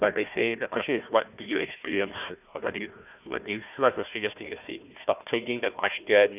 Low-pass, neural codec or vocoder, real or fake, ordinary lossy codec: 3.6 kHz; codec, 16 kHz, 1 kbps, FunCodec, trained on Chinese and English, 50 frames a second; fake; none